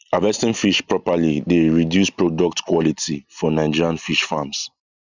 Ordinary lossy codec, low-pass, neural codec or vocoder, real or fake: none; 7.2 kHz; none; real